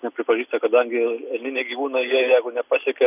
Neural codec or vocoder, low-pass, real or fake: none; 3.6 kHz; real